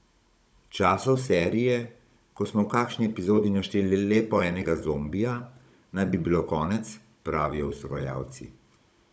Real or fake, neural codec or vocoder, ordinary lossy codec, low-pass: fake; codec, 16 kHz, 16 kbps, FunCodec, trained on Chinese and English, 50 frames a second; none; none